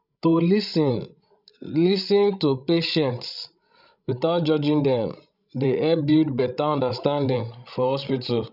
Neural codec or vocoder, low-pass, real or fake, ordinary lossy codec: codec, 16 kHz, 16 kbps, FreqCodec, larger model; 5.4 kHz; fake; none